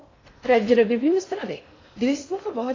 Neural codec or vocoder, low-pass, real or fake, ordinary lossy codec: codec, 16 kHz in and 24 kHz out, 0.6 kbps, FocalCodec, streaming, 4096 codes; 7.2 kHz; fake; AAC, 32 kbps